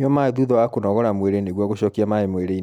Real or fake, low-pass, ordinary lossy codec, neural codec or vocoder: real; 19.8 kHz; none; none